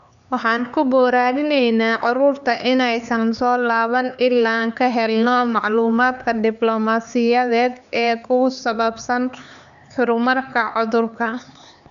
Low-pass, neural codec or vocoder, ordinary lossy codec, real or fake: 7.2 kHz; codec, 16 kHz, 4 kbps, X-Codec, HuBERT features, trained on LibriSpeech; none; fake